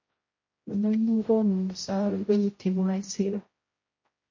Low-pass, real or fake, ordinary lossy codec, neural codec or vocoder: 7.2 kHz; fake; MP3, 32 kbps; codec, 16 kHz, 0.5 kbps, X-Codec, HuBERT features, trained on general audio